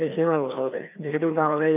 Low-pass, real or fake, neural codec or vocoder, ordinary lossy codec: 3.6 kHz; fake; codec, 16 kHz, 2 kbps, FreqCodec, larger model; none